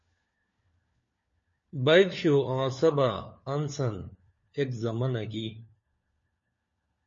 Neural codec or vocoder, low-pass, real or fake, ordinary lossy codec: codec, 16 kHz, 4 kbps, FunCodec, trained on LibriTTS, 50 frames a second; 7.2 kHz; fake; MP3, 32 kbps